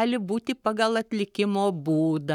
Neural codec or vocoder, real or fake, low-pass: codec, 44.1 kHz, 7.8 kbps, Pupu-Codec; fake; 19.8 kHz